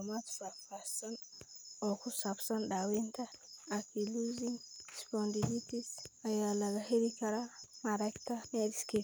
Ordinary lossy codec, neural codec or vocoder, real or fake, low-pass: none; none; real; none